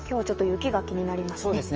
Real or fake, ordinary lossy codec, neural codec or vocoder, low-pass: fake; Opus, 24 kbps; autoencoder, 48 kHz, 128 numbers a frame, DAC-VAE, trained on Japanese speech; 7.2 kHz